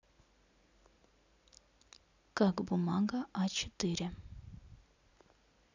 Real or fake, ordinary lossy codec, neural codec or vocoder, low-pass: real; none; none; 7.2 kHz